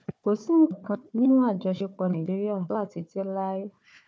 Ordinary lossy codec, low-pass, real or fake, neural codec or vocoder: none; none; fake; codec, 16 kHz, 4 kbps, FunCodec, trained on Chinese and English, 50 frames a second